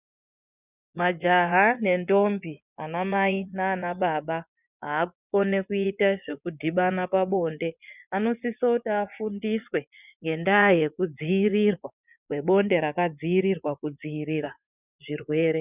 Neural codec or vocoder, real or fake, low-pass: vocoder, 24 kHz, 100 mel bands, Vocos; fake; 3.6 kHz